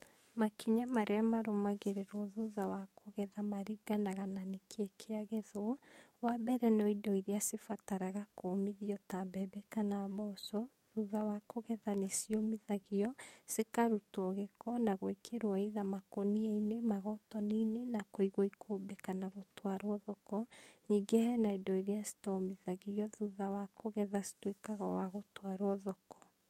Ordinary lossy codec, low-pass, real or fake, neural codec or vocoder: MP3, 64 kbps; 19.8 kHz; fake; codec, 44.1 kHz, 7.8 kbps, DAC